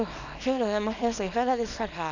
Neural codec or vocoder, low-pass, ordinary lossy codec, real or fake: codec, 24 kHz, 0.9 kbps, WavTokenizer, small release; 7.2 kHz; none; fake